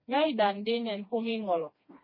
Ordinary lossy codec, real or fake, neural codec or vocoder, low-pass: MP3, 24 kbps; fake; codec, 16 kHz, 2 kbps, FreqCodec, smaller model; 5.4 kHz